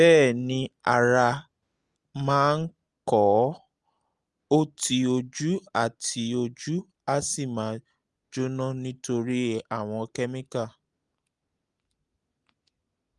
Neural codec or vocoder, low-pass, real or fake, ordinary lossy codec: none; 10.8 kHz; real; Opus, 32 kbps